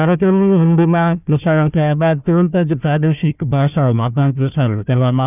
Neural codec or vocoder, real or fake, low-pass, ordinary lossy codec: codec, 16 kHz, 1 kbps, FunCodec, trained on Chinese and English, 50 frames a second; fake; 3.6 kHz; none